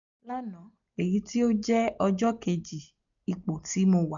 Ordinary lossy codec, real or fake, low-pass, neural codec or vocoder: none; real; 7.2 kHz; none